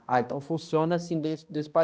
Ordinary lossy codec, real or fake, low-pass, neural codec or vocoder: none; fake; none; codec, 16 kHz, 1 kbps, X-Codec, HuBERT features, trained on balanced general audio